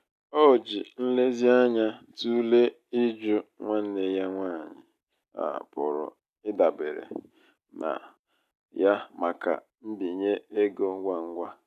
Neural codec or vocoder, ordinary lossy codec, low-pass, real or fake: none; none; 14.4 kHz; real